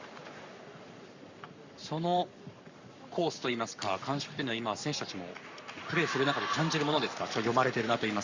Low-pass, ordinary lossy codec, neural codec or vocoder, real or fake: 7.2 kHz; Opus, 64 kbps; codec, 44.1 kHz, 7.8 kbps, Pupu-Codec; fake